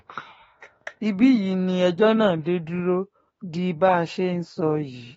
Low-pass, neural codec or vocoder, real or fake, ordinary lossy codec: 19.8 kHz; autoencoder, 48 kHz, 32 numbers a frame, DAC-VAE, trained on Japanese speech; fake; AAC, 24 kbps